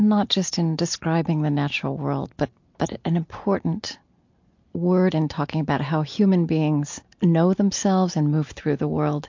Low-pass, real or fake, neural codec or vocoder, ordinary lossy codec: 7.2 kHz; real; none; MP3, 48 kbps